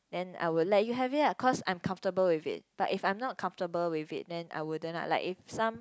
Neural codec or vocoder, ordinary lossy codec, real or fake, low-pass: none; none; real; none